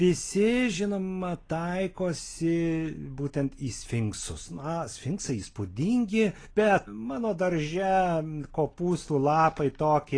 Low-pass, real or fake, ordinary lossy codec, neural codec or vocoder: 9.9 kHz; real; AAC, 32 kbps; none